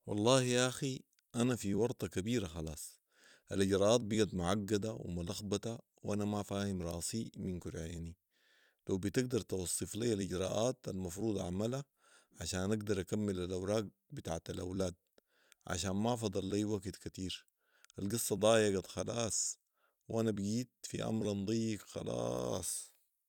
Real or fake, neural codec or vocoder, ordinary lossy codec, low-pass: fake; vocoder, 44.1 kHz, 128 mel bands every 256 samples, BigVGAN v2; none; 19.8 kHz